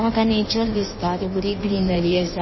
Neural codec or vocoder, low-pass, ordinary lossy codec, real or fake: codec, 16 kHz in and 24 kHz out, 1.1 kbps, FireRedTTS-2 codec; 7.2 kHz; MP3, 24 kbps; fake